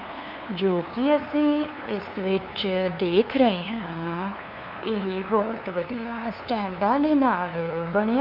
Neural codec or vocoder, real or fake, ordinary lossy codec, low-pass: codec, 16 kHz, 2 kbps, FunCodec, trained on LibriTTS, 25 frames a second; fake; MP3, 48 kbps; 5.4 kHz